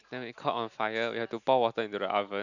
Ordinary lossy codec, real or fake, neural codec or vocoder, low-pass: none; real; none; 7.2 kHz